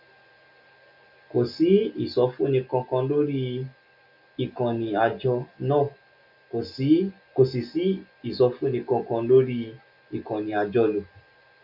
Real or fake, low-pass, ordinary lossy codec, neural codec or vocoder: real; 5.4 kHz; none; none